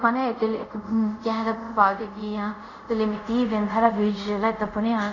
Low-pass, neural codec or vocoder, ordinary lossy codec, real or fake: 7.2 kHz; codec, 24 kHz, 0.5 kbps, DualCodec; none; fake